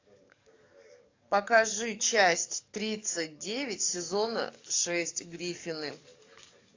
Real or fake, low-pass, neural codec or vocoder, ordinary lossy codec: fake; 7.2 kHz; codec, 44.1 kHz, 7.8 kbps, DAC; AAC, 48 kbps